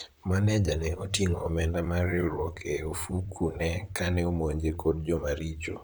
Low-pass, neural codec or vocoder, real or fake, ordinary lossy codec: none; vocoder, 44.1 kHz, 128 mel bands, Pupu-Vocoder; fake; none